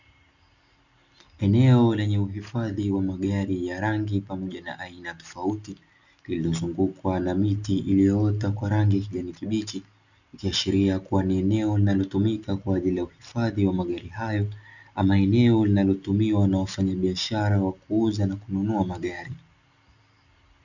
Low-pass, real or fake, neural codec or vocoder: 7.2 kHz; real; none